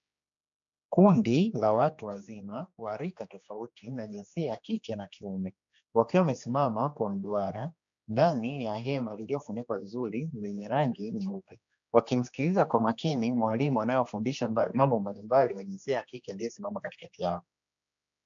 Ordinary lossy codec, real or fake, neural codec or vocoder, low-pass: AAC, 64 kbps; fake; codec, 16 kHz, 2 kbps, X-Codec, HuBERT features, trained on general audio; 7.2 kHz